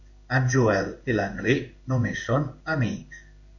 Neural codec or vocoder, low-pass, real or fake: codec, 16 kHz in and 24 kHz out, 1 kbps, XY-Tokenizer; 7.2 kHz; fake